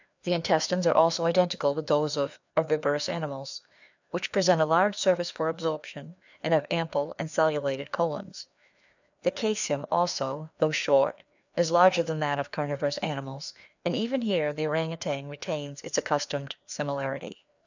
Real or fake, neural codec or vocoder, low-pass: fake; codec, 16 kHz, 2 kbps, FreqCodec, larger model; 7.2 kHz